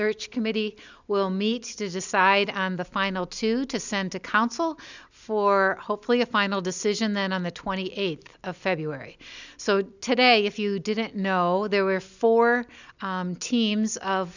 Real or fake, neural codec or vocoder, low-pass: real; none; 7.2 kHz